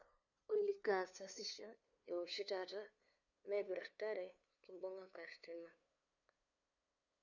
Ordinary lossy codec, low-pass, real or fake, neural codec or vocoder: none; 7.2 kHz; fake; codec, 16 kHz, 2 kbps, FunCodec, trained on LibriTTS, 25 frames a second